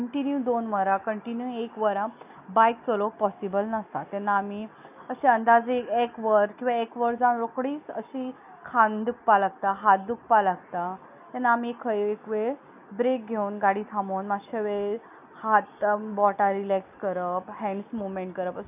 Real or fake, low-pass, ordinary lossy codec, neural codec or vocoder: real; 3.6 kHz; none; none